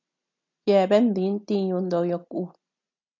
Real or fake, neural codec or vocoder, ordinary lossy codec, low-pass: real; none; AAC, 48 kbps; 7.2 kHz